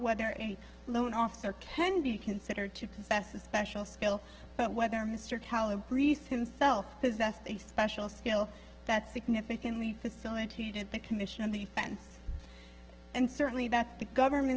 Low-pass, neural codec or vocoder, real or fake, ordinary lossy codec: 7.2 kHz; codec, 16 kHz, 6 kbps, DAC; fake; Opus, 16 kbps